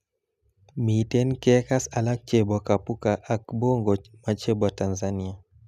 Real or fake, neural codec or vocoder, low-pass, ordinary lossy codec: real; none; 14.4 kHz; none